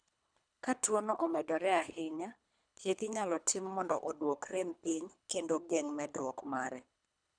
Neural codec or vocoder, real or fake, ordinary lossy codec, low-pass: codec, 24 kHz, 3 kbps, HILCodec; fake; none; 9.9 kHz